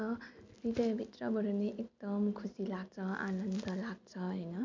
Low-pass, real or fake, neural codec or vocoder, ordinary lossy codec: 7.2 kHz; real; none; MP3, 64 kbps